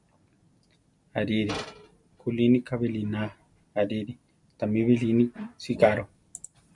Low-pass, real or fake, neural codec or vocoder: 10.8 kHz; real; none